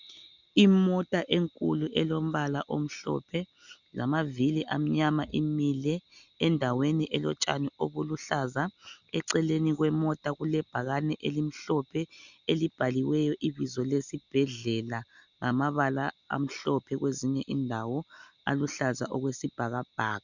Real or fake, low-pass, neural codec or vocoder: real; 7.2 kHz; none